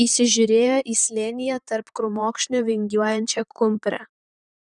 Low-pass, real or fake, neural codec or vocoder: 10.8 kHz; fake; vocoder, 44.1 kHz, 128 mel bands, Pupu-Vocoder